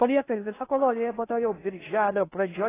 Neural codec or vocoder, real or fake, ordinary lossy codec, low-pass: codec, 16 kHz in and 24 kHz out, 0.6 kbps, FocalCodec, streaming, 4096 codes; fake; AAC, 16 kbps; 3.6 kHz